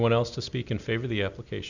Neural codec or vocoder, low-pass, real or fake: none; 7.2 kHz; real